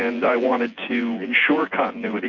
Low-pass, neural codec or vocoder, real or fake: 7.2 kHz; vocoder, 24 kHz, 100 mel bands, Vocos; fake